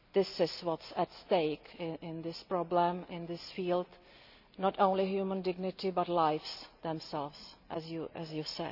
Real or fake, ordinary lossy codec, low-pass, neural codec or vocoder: real; none; 5.4 kHz; none